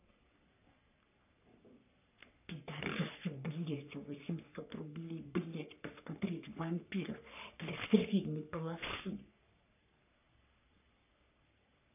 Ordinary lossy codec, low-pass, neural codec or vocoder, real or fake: none; 3.6 kHz; codec, 44.1 kHz, 3.4 kbps, Pupu-Codec; fake